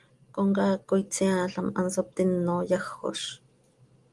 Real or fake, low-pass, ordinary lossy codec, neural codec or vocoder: real; 10.8 kHz; Opus, 24 kbps; none